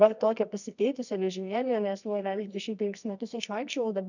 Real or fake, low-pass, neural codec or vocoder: fake; 7.2 kHz; codec, 24 kHz, 0.9 kbps, WavTokenizer, medium music audio release